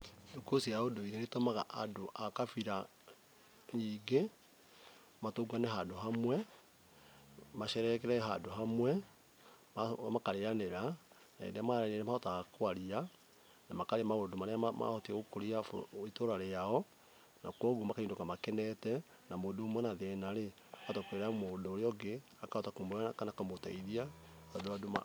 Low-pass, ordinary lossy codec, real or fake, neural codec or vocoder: none; none; real; none